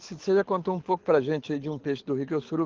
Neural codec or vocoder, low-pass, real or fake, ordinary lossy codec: codec, 24 kHz, 6 kbps, HILCodec; 7.2 kHz; fake; Opus, 16 kbps